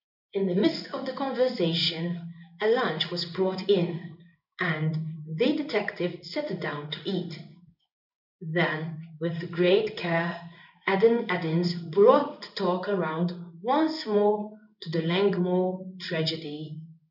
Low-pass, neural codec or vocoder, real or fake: 5.4 kHz; codec, 16 kHz in and 24 kHz out, 1 kbps, XY-Tokenizer; fake